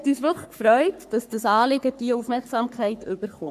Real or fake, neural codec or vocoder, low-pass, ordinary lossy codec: fake; codec, 44.1 kHz, 3.4 kbps, Pupu-Codec; 14.4 kHz; none